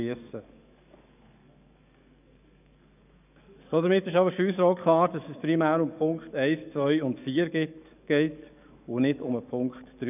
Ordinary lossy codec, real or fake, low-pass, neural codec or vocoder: none; fake; 3.6 kHz; codec, 44.1 kHz, 7.8 kbps, DAC